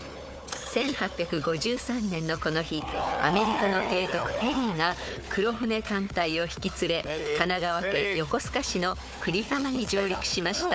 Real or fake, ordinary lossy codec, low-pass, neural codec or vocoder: fake; none; none; codec, 16 kHz, 4 kbps, FunCodec, trained on Chinese and English, 50 frames a second